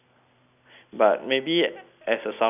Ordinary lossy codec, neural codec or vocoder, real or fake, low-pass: none; none; real; 3.6 kHz